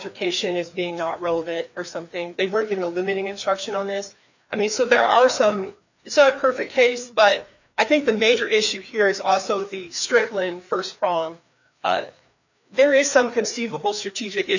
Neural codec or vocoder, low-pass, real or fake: codec, 16 kHz, 2 kbps, FreqCodec, larger model; 7.2 kHz; fake